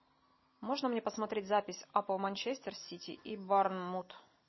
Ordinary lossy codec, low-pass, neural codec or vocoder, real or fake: MP3, 24 kbps; 7.2 kHz; none; real